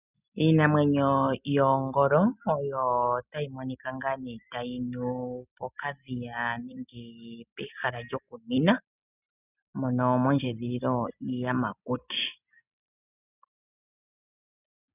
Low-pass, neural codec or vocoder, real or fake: 3.6 kHz; none; real